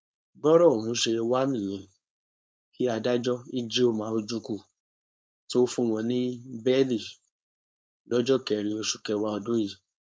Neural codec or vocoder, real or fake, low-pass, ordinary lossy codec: codec, 16 kHz, 4.8 kbps, FACodec; fake; none; none